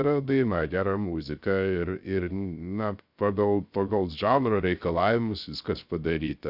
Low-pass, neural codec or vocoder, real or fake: 5.4 kHz; codec, 16 kHz, 0.3 kbps, FocalCodec; fake